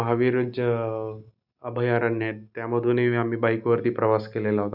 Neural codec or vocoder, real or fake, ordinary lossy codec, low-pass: none; real; none; 5.4 kHz